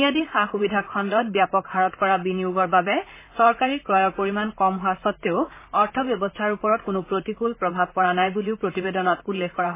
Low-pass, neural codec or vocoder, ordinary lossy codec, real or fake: 3.6 kHz; vocoder, 44.1 kHz, 128 mel bands, Pupu-Vocoder; MP3, 16 kbps; fake